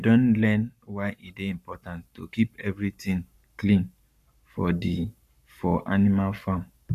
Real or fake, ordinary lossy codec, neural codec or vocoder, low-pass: fake; none; codec, 44.1 kHz, 7.8 kbps, Pupu-Codec; 14.4 kHz